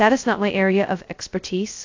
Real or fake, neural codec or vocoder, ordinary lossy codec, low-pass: fake; codec, 16 kHz, 0.2 kbps, FocalCodec; AAC, 48 kbps; 7.2 kHz